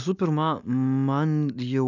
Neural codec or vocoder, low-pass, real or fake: none; 7.2 kHz; real